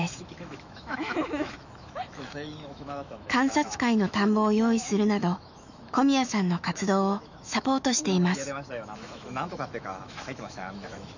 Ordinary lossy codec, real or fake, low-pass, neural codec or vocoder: none; fake; 7.2 kHz; autoencoder, 48 kHz, 128 numbers a frame, DAC-VAE, trained on Japanese speech